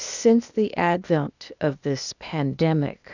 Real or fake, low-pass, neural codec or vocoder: fake; 7.2 kHz; codec, 16 kHz, 0.8 kbps, ZipCodec